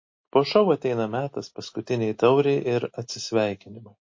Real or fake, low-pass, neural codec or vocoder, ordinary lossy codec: real; 7.2 kHz; none; MP3, 32 kbps